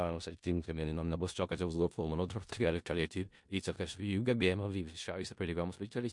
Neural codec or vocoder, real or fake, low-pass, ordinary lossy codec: codec, 16 kHz in and 24 kHz out, 0.4 kbps, LongCat-Audio-Codec, four codebook decoder; fake; 10.8 kHz; MP3, 64 kbps